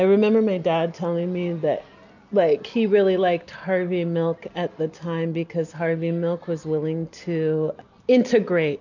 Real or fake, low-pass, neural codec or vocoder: real; 7.2 kHz; none